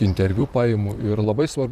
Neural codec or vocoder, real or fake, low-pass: vocoder, 44.1 kHz, 128 mel bands, Pupu-Vocoder; fake; 14.4 kHz